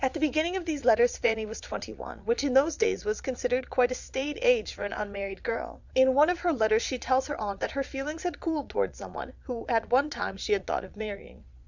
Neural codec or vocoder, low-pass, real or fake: vocoder, 22.05 kHz, 80 mel bands, Vocos; 7.2 kHz; fake